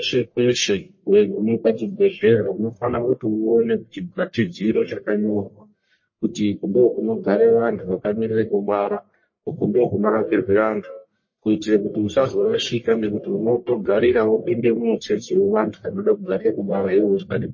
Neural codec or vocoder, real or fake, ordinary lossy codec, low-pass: codec, 44.1 kHz, 1.7 kbps, Pupu-Codec; fake; MP3, 32 kbps; 7.2 kHz